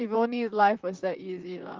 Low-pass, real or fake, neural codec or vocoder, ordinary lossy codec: 7.2 kHz; fake; vocoder, 44.1 kHz, 128 mel bands, Pupu-Vocoder; Opus, 32 kbps